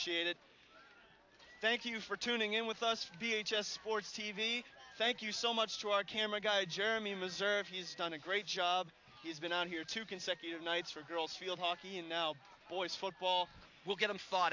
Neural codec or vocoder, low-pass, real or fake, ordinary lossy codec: none; 7.2 kHz; real; AAC, 48 kbps